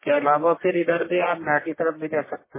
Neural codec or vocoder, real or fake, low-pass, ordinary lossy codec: codec, 44.1 kHz, 1.7 kbps, Pupu-Codec; fake; 3.6 kHz; MP3, 16 kbps